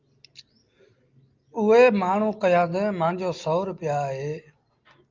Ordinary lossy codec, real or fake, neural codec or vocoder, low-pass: Opus, 24 kbps; real; none; 7.2 kHz